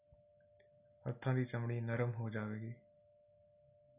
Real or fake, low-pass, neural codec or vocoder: real; 3.6 kHz; none